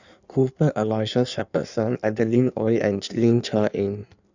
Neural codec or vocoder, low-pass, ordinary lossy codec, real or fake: codec, 16 kHz in and 24 kHz out, 1.1 kbps, FireRedTTS-2 codec; 7.2 kHz; none; fake